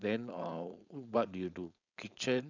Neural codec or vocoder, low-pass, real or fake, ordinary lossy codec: codec, 16 kHz, 4.8 kbps, FACodec; 7.2 kHz; fake; none